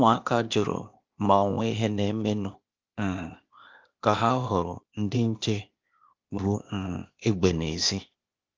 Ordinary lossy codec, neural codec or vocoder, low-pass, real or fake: Opus, 24 kbps; codec, 16 kHz, 0.8 kbps, ZipCodec; 7.2 kHz; fake